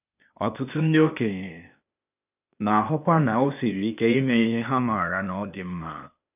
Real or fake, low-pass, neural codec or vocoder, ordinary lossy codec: fake; 3.6 kHz; codec, 16 kHz, 0.8 kbps, ZipCodec; none